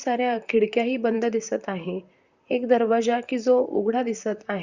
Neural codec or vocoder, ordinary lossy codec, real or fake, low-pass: vocoder, 44.1 kHz, 128 mel bands, Pupu-Vocoder; Opus, 64 kbps; fake; 7.2 kHz